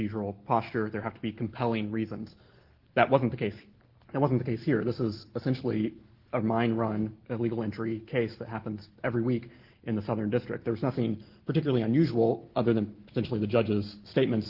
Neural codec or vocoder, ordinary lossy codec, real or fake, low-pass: none; Opus, 16 kbps; real; 5.4 kHz